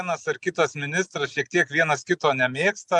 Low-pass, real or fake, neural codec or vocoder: 10.8 kHz; real; none